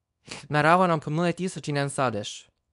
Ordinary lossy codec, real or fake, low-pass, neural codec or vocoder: none; fake; 10.8 kHz; codec, 24 kHz, 0.9 kbps, WavTokenizer, small release